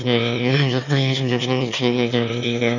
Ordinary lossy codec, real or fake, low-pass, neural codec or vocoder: none; fake; 7.2 kHz; autoencoder, 22.05 kHz, a latent of 192 numbers a frame, VITS, trained on one speaker